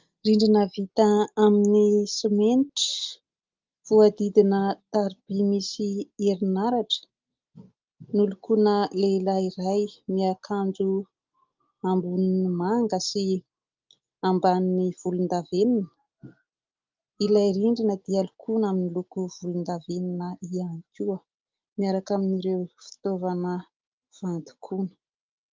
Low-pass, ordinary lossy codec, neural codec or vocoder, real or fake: 7.2 kHz; Opus, 32 kbps; none; real